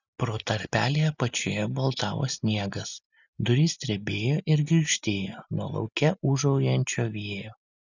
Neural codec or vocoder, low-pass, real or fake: none; 7.2 kHz; real